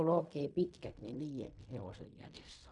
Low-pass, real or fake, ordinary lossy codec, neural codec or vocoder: 10.8 kHz; fake; none; codec, 16 kHz in and 24 kHz out, 0.4 kbps, LongCat-Audio-Codec, fine tuned four codebook decoder